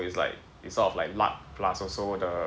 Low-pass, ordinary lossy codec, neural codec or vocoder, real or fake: none; none; none; real